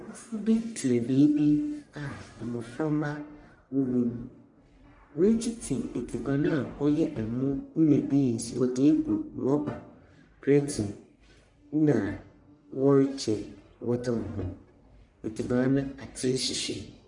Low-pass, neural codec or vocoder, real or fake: 10.8 kHz; codec, 44.1 kHz, 1.7 kbps, Pupu-Codec; fake